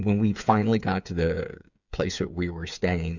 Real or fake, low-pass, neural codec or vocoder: fake; 7.2 kHz; codec, 16 kHz, 8 kbps, FreqCodec, smaller model